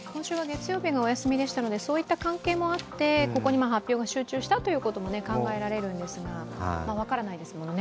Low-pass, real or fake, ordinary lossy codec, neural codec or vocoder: none; real; none; none